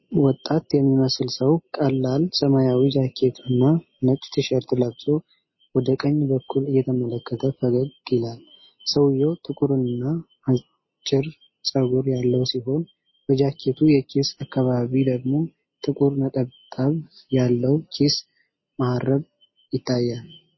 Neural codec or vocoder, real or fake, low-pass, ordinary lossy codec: none; real; 7.2 kHz; MP3, 24 kbps